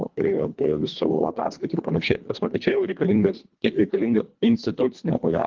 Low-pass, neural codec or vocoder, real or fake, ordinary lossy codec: 7.2 kHz; codec, 24 kHz, 1.5 kbps, HILCodec; fake; Opus, 32 kbps